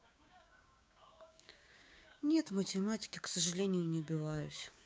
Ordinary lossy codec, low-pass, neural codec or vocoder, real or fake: none; none; codec, 16 kHz, 6 kbps, DAC; fake